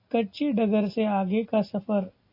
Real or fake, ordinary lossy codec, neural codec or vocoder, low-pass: real; AAC, 32 kbps; none; 5.4 kHz